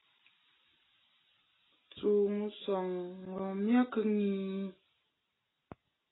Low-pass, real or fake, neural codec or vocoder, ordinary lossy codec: 7.2 kHz; real; none; AAC, 16 kbps